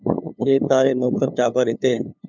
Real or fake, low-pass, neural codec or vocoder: fake; 7.2 kHz; codec, 16 kHz, 2 kbps, FunCodec, trained on LibriTTS, 25 frames a second